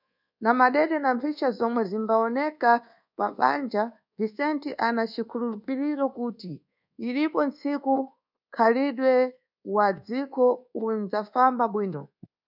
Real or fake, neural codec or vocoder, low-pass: fake; codec, 24 kHz, 1.2 kbps, DualCodec; 5.4 kHz